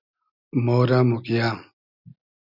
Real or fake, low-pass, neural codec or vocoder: real; 5.4 kHz; none